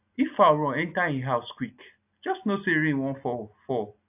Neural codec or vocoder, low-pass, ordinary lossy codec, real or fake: none; 3.6 kHz; AAC, 32 kbps; real